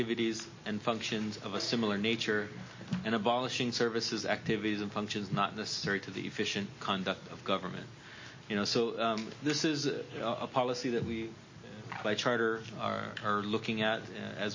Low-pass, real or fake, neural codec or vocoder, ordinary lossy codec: 7.2 kHz; real; none; MP3, 32 kbps